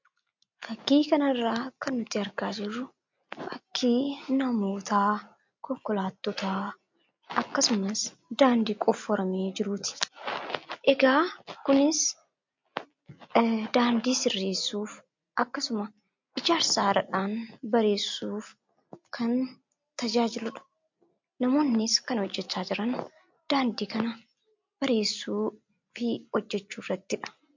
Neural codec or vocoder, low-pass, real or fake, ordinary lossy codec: none; 7.2 kHz; real; MP3, 48 kbps